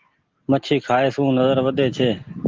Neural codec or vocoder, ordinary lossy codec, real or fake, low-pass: none; Opus, 16 kbps; real; 7.2 kHz